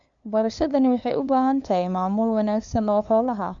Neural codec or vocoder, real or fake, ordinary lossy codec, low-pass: codec, 16 kHz, 2 kbps, FunCodec, trained on LibriTTS, 25 frames a second; fake; MP3, 64 kbps; 7.2 kHz